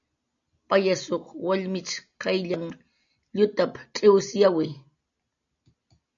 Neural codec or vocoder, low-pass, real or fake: none; 7.2 kHz; real